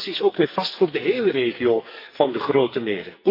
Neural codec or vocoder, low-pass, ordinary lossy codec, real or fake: codec, 44.1 kHz, 2.6 kbps, SNAC; 5.4 kHz; AAC, 32 kbps; fake